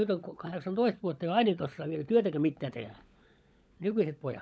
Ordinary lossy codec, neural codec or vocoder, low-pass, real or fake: none; codec, 16 kHz, 16 kbps, FunCodec, trained on LibriTTS, 50 frames a second; none; fake